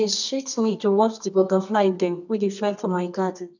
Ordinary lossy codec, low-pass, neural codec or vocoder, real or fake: none; 7.2 kHz; codec, 24 kHz, 0.9 kbps, WavTokenizer, medium music audio release; fake